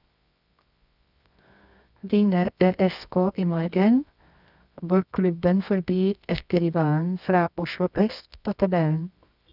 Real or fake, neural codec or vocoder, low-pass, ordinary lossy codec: fake; codec, 24 kHz, 0.9 kbps, WavTokenizer, medium music audio release; 5.4 kHz; none